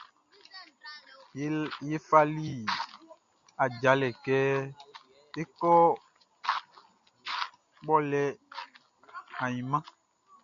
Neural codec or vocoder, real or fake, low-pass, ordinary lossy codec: none; real; 7.2 kHz; MP3, 96 kbps